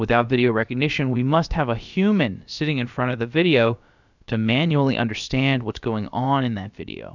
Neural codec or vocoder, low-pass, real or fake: codec, 16 kHz, about 1 kbps, DyCAST, with the encoder's durations; 7.2 kHz; fake